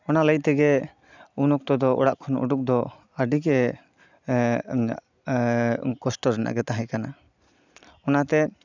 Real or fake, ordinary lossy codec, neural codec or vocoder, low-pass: real; none; none; 7.2 kHz